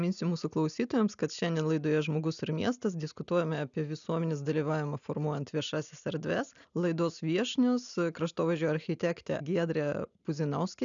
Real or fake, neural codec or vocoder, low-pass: real; none; 7.2 kHz